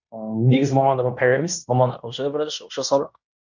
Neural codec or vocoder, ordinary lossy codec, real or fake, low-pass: codec, 16 kHz in and 24 kHz out, 0.9 kbps, LongCat-Audio-Codec, fine tuned four codebook decoder; none; fake; 7.2 kHz